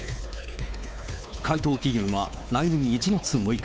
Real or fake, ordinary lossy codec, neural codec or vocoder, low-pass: fake; none; codec, 16 kHz, 4 kbps, X-Codec, WavLM features, trained on Multilingual LibriSpeech; none